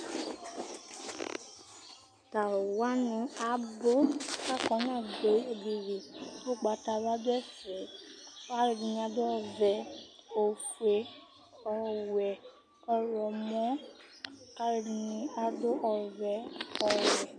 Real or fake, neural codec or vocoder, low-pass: real; none; 9.9 kHz